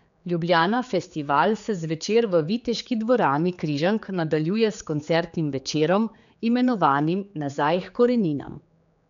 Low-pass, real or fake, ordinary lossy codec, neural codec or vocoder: 7.2 kHz; fake; none; codec, 16 kHz, 4 kbps, X-Codec, HuBERT features, trained on general audio